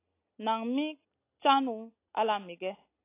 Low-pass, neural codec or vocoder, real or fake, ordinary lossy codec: 3.6 kHz; none; real; AAC, 24 kbps